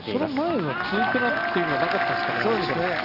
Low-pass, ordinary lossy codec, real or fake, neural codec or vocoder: 5.4 kHz; Opus, 24 kbps; real; none